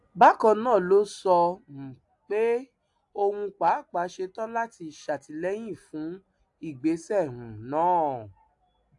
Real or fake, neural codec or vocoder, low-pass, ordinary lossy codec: real; none; 10.8 kHz; AAC, 64 kbps